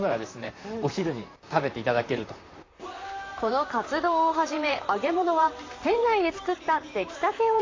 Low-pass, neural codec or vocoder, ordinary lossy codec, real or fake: 7.2 kHz; vocoder, 44.1 kHz, 128 mel bands, Pupu-Vocoder; AAC, 32 kbps; fake